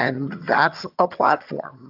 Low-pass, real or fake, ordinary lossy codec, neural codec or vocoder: 5.4 kHz; fake; AAC, 48 kbps; vocoder, 22.05 kHz, 80 mel bands, HiFi-GAN